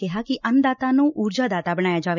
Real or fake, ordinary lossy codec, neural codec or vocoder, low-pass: real; none; none; 7.2 kHz